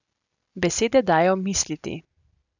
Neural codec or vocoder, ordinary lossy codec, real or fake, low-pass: none; none; real; 7.2 kHz